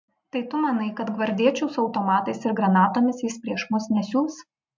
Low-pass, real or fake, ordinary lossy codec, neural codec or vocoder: 7.2 kHz; real; MP3, 64 kbps; none